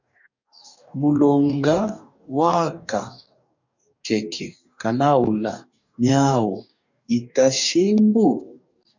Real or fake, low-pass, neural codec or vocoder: fake; 7.2 kHz; codec, 44.1 kHz, 2.6 kbps, DAC